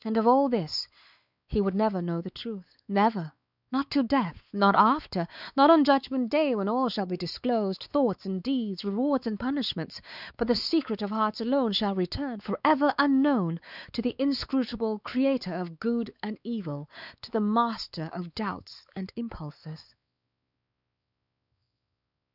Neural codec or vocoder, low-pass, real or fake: codec, 16 kHz, 4 kbps, X-Codec, WavLM features, trained on Multilingual LibriSpeech; 5.4 kHz; fake